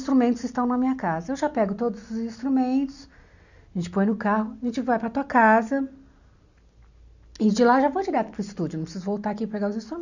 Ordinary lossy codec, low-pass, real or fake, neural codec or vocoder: none; 7.2 kHz; real; none